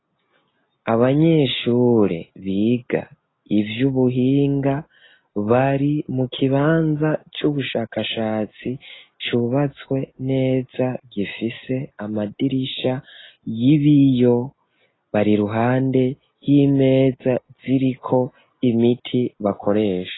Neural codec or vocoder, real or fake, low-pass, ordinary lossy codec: none; real; 7.2 kHz; AAC, 16 kbps